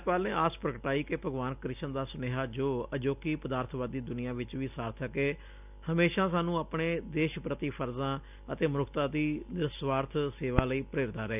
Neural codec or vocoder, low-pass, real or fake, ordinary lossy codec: none; 3.6 kHz; real; none